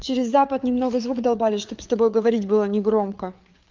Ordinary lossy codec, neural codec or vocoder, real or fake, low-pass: Opus, 24 kbps; codec, 16 kHz, 4 kbps, FreqCodec, larger model; fake; 7.2 kHz